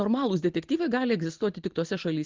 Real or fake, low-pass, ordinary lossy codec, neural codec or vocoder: real; 7.2 kHz; Opus, 16 kbps; none